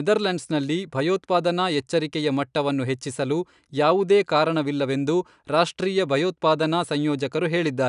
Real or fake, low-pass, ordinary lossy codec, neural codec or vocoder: real; 10.8 kHz; none; none